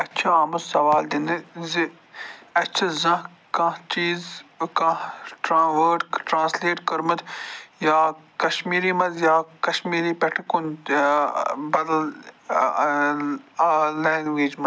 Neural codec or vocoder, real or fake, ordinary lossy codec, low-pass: none; real; none; none